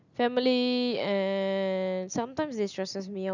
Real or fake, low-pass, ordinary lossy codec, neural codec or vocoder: real; 7.2 kHz; none; none